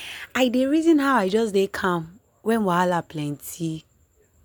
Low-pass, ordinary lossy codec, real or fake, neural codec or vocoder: none; none; real; none